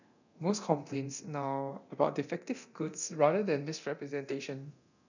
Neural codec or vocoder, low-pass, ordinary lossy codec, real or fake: codec, 24 kHz, 0.9 kbps, DualCodec; 7.2 kHz; none; fake